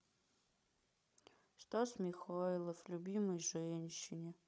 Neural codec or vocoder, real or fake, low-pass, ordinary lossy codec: codec, 16 kHz, 16 kbps, FreqCodec, larger model; fake; none; none